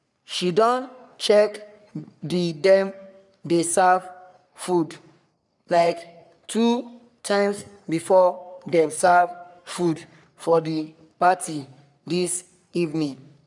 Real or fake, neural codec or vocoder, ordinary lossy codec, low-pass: fake; codec, 44.1 kHz, 3.4 kbps, Pupu-Codec; none; 10.8 kHz